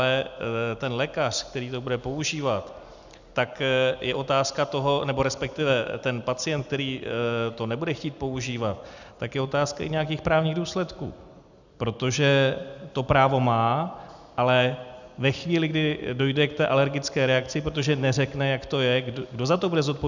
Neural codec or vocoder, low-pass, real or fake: none; 7.2 kHz; real